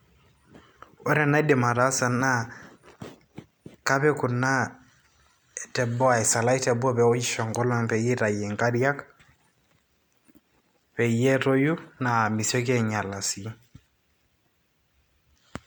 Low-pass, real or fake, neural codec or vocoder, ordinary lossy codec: none; real; none; none